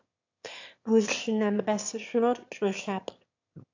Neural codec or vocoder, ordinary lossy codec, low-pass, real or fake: autoencoder, 22.05 kHz, a latent of 192 numbers a frame, VITS, trained on one speaker; AAC, 48 kbps; 7.2 kHz; fake